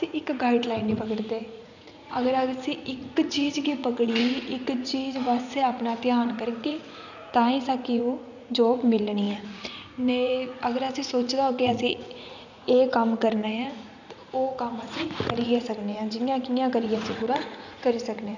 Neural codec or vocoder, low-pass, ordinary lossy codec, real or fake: vocoder, 44.1 kHz, 80 mel bands, Vocos; 7.2 kHz; none; fake